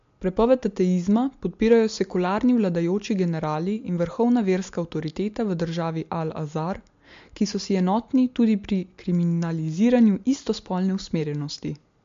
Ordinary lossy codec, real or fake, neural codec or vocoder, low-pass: MP3, 48 kbps; real; none; 7.2 kHz